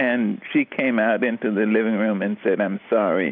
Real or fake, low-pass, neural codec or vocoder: real; 5.4 kHz; none